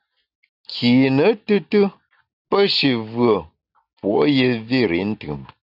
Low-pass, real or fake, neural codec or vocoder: 5.4 kHz; real; none